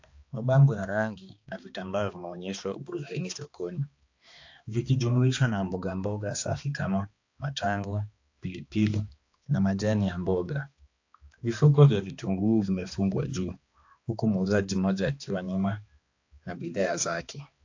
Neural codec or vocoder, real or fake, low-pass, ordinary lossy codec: codec, 16 kHz, 2 kbps, X-Codec, HuBERT features, trained on balanced general audio; fake; 7.2 kHz; AAC, 48 kbps